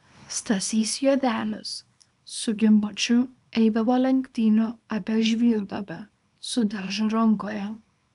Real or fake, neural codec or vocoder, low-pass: fake; codec, 24 kHz, 0.9 kbps, WavTokenizer, small release; 10.8 kHz